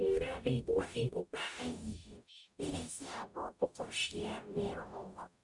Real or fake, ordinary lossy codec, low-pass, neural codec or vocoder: fake; none; 10.8 kHz; codec, 44.1 kHz, 0.9 kbps, DAC